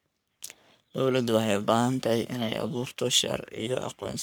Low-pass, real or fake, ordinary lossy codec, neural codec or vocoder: none; fake; none; codec, 44.1 kHz, 3.4 kbps, Pupu-Codec